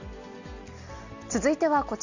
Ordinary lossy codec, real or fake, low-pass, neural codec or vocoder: none; real; 7.2 kHz; none